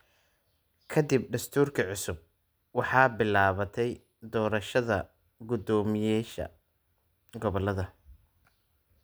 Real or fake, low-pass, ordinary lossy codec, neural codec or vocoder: real; none; none; none